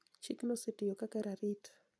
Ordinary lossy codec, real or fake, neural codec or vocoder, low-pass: none; real; none; none